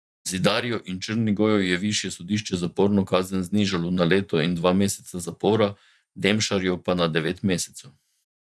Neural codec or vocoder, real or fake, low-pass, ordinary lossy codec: vocoder, 24 kHz, 100 mel bands, Vocos; fake; none; none